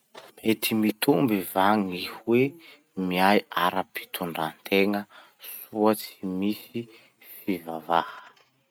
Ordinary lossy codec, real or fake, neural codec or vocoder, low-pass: none; real; none; 19.8 kHz